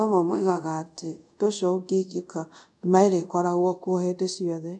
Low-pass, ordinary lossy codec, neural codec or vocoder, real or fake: 10.8 kHz; none; codec, 24 kHz, 0.5 kbps, DualCodec; fake